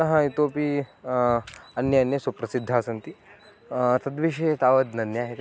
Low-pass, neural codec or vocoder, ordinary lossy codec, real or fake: none; none; none; real